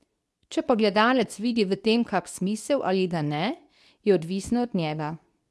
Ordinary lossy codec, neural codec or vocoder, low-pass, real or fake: none; codec, 24 kHz, 0.9 kbps, WavTokenizer, medium speech release version 2; none; fake